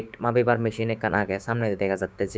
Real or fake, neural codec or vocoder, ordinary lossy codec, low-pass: fake; codec, 16 kHz, 6 kbps, DAC; none; none